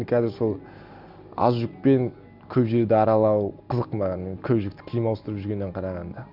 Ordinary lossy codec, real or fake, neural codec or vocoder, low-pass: none; real; none; 5.4 kHz